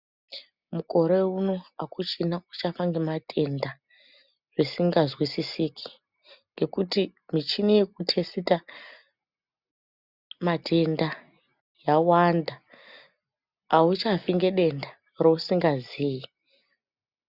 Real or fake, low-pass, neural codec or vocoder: real; 5.4 kHz; none